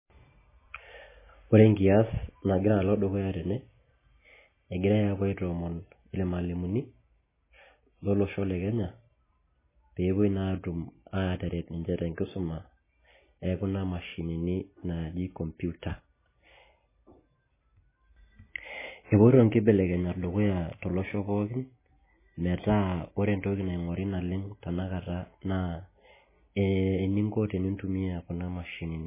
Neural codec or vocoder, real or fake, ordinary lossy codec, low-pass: none; real; MP3, 16 kbps; 3.6 kHz